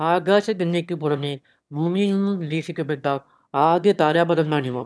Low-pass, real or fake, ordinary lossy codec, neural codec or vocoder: none; fake; none; autoencoder, 22.05 kHz, a latent of 192 numbers a frame, VITS, trained on one speaker